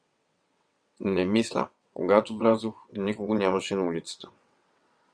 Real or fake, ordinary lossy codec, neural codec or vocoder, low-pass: fake; Opus, 64 kbps; vocoder, 22.05 kHz, 80 mel bands, WaveNeXt; 9.9 kHz